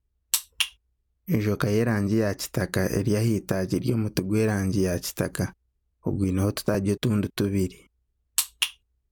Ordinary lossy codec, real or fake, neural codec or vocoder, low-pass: none; real; none; none